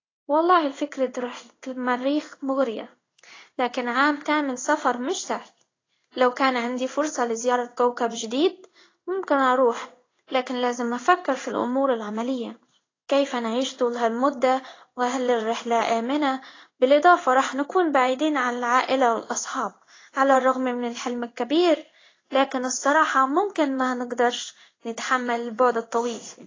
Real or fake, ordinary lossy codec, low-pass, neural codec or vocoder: fake; AAC, 32 kbps; 7.2 kHz; codec, 16 kHz in and 24 kHz out, 1 kbps, XY-Tokenizer